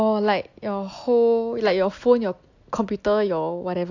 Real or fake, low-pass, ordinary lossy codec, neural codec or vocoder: real; 7.2 kHz; AAC, 48 kbps; none